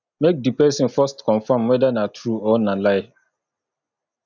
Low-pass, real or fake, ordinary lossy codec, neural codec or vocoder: 7.2 kHz; real; none; none